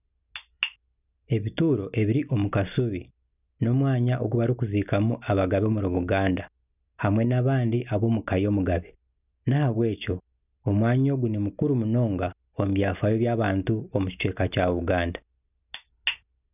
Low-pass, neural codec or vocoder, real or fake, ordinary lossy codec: 3.6 kHz; none; real; none